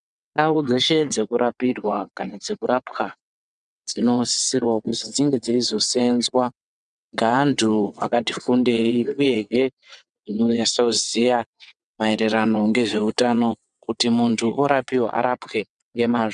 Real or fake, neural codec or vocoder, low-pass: fake; vocoder, 22.05 kHz, 80 mel bands, WaveNeXt; 9.9 kHz